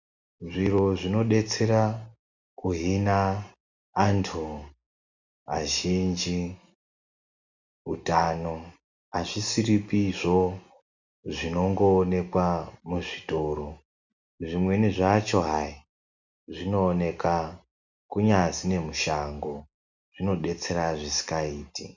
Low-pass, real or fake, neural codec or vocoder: 7.2 kHz; real; none